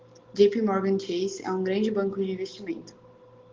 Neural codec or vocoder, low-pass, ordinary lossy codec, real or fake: none; 7.2 kHz; Opus, 16 kbps; real